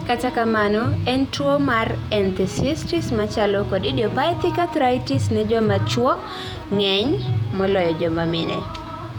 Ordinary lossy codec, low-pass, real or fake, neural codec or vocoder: none; 19.8 kHz; fake; vocoder, 48 kHz, 128 mel bands, Vocos